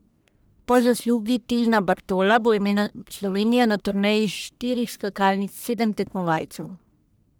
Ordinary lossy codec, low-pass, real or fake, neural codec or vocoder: none; none; fake; codec, 44.1 kHz, 1.7 kbps, Pupu-Codec